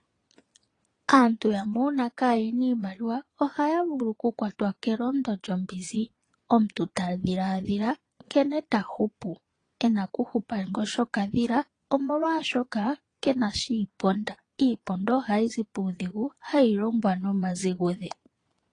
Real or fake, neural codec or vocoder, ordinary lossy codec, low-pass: fake; vocoder, 22.05 kHz, 80 mel bands, Vocos; AAC, 32 kbps; 9.9 kHz